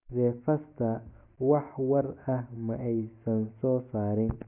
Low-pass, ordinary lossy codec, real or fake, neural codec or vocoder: 3.6 kHz; none; real; none